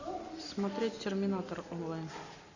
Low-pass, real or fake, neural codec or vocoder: 7.2 kHz; real; none